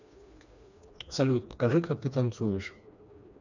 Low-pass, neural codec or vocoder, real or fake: 7.2 kHz; codec, 16 kHz, 2 kbps, FreqCodec, smaller model; fake